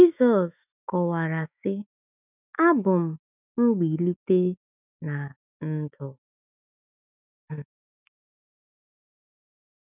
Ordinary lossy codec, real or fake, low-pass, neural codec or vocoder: none; fake; 3.6 kHz; autoencoder, 48 kHz, 128 numbers a frame, DAC-VAE, trained on Japanese speech